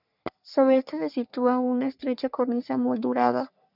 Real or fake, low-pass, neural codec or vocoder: fake; 5.4 kHz; codec, 44.1 kHz, 3.4 kbps, Pupu-Codec